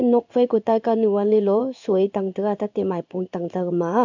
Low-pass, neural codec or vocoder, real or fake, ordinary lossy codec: 7.2 kHz; codec, 16 kHz in and 24 kHz out, 1 kbps, XY-Tokenizer; fake; none